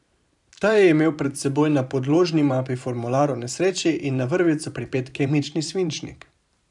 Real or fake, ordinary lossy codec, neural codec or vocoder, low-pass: fake; none; vocoder, 44.1 kHz, 128 mel bands every 512 samples, BigVGAN v2; 10.8 kHz